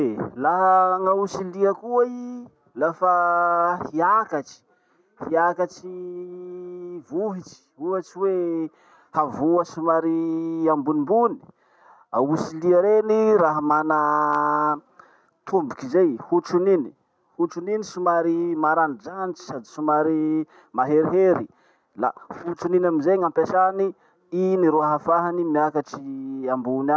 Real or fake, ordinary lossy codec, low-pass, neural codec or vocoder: real; none; none; none